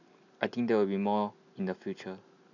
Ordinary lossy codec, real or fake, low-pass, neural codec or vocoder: none; real; 7.2 kHz; none